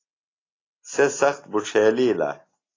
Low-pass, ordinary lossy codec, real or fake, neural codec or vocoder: 7.2 kHz; AAC, 32 kbps; real; none